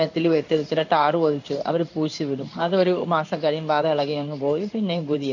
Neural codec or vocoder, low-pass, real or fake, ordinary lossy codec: codec, 16 kHz in and 24 kHz out, 1 kbps, XY-Tokenizer; 7.2 kHz; fake; none